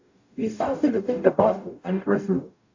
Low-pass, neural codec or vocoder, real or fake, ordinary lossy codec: 7.2 kHz; codec, 44.1 kHz, 0.9 kbps, DAC; fake; AAC, 48 kbps